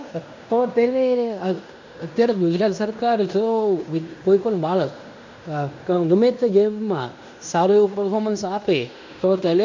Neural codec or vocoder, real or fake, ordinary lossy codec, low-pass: codec, 16 kHz in and 24 kHz out, 0.9 kbps, LongCat-Audio-Codec, fine tuned four codebook decoder; fake; MP3, 64 kbps; 7.2 kHz